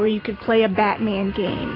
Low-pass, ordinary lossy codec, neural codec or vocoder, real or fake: 5.4 kHz; AAC, 24 kbps; vocoder, 44.1 kHz, 128 mel bands every 512 samples, BigVGAN v2; fake